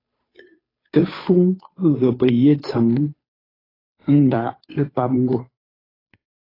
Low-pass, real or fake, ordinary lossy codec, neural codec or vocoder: 5.4 kHz; fake; AAC, 24 kbps; codec, 16 kHz, 2 kbps, FunCodec, trained on Chinese and English, 25 frames a second